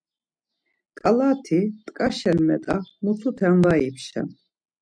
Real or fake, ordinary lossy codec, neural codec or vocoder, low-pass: real; MP3, 96 kbps; none; 9.9 kHz